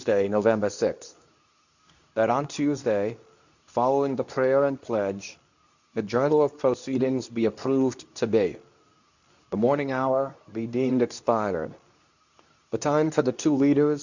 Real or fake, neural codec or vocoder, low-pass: fake; codec, 24 kHz, 0.9 kbps, WavTokenizer, medium speech release version 2; 7.2 kHz